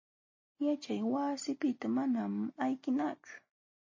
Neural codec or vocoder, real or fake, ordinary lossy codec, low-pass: none; real; MP3, 32 kbps; 7.2 kHz